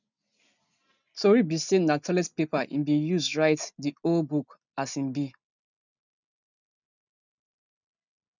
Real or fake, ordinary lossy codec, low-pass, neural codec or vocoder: real; none; 7.2 kHz; none